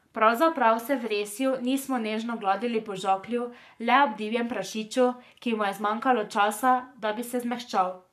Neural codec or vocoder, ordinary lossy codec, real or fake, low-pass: codec, 44.1 kHz, 7.8 kbps, Pupu-Codec; none; fake; 14.4 kHz